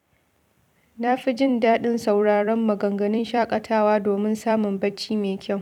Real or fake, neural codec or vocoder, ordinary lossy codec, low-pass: fake; vocoder, 44.1 kHz, 128 mel bands every 256 samples, BigVGAN v2; none; 19.8 kHz